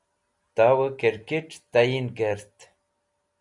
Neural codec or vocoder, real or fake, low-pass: none; real; 10.8 kHz